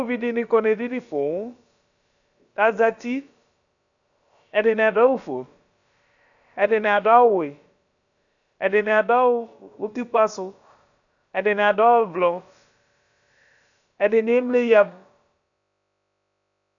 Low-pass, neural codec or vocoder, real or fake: 7.2 kHz; codec, 16 kHz, about 1 kbps, DyCAST, with the encoder's durations; fake